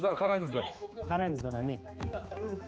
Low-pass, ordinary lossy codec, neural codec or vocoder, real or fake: none; none; codec, 16 kHz, 2 kbps, X-Codec, HuBERT features, trained on general audio; fake